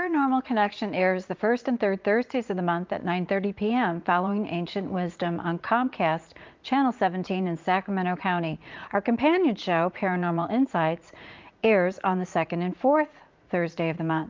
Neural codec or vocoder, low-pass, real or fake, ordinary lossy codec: vocoder, 44.1 kHz, 80 mel bands, Vocos; 7.2 kHz; fake; Opus, 32 kbps